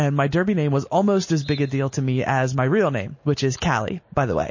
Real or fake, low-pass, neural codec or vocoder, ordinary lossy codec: real; 7.2 kHz; none; MP3, 32 kbps